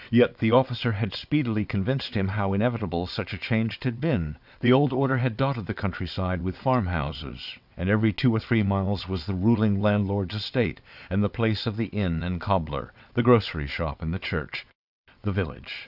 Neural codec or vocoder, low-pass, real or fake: vocoder, 22.05 kHz, 80 mel bands, WaveNeXt; 5.4 kHz; fake